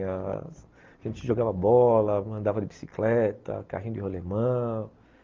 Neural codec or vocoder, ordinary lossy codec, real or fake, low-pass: none; Opus, 32 kbps; real; 7.2 kHz